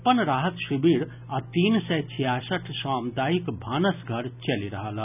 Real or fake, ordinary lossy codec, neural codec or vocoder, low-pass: real; none; none; 3.6 kHz